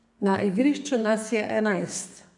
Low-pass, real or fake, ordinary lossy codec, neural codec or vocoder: 10.8 kHz; fake; none; codec, 32 kHz, 1.9 kbps, SNAC